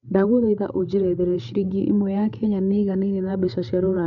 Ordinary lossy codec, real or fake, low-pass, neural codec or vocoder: none; fake; 7.2 kHz; codec, 16 kHz, 8 kbps, FreqCodec, larger model